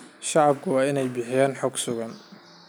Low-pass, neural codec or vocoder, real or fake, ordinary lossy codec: none; none; real; none